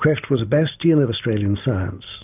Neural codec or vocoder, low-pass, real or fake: none; 3.6 kHz; real